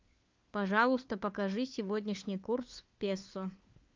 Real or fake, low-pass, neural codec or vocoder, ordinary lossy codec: fake; 7.2 kHz; codec, 16 kHz, 4 kbps, FunCodec, trained on LibriTTS, 50 frames a second; Opus, 24 kbps